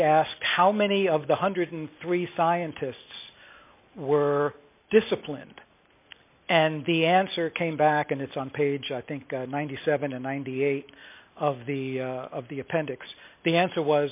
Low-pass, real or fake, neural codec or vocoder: 3.6 kHz; real; none